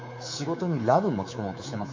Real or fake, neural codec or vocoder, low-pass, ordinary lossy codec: fake; codec, 24 kHz, 3.1 kbps, DualCodec; 7.2 kHz; MP3, 48 kbps